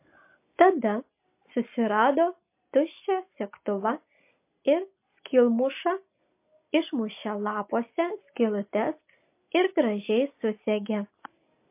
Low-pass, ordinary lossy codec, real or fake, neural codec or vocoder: 3.6 kHz; MP3, 24 kbps; real; none